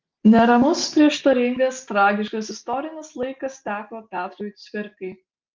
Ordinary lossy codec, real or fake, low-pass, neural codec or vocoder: Opus, 32 kbps; real; 7.2 kHz; none